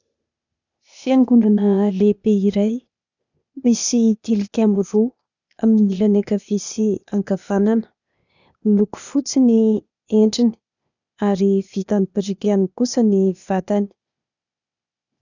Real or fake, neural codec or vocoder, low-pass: fake; codec, 16 kHz, 0.8 kbps, ZipCodec; 7.2 kHz